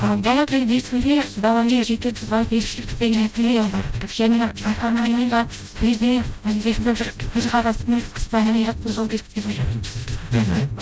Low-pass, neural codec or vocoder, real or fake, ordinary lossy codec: none; codec, 16 kHz, 0.5 kbps, FreqCodec, smaller model; fake; none